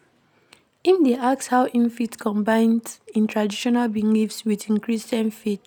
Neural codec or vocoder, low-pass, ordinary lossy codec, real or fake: none; 19.8 kHz; none; real